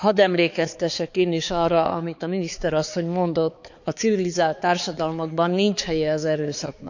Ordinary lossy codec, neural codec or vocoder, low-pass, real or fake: none; codec, 16 kHz, 4 kbps, X-Codec, HuBERT features, trained on balanced general audio; 7.2 kHz; fake